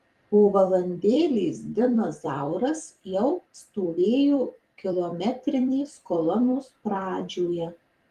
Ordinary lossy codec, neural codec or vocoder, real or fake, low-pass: Opus, 24 kbps; vocoder, 44.1 kHz, 128 mel bands every 256 samples, BigVGAN v2; fake; 14.4 kHz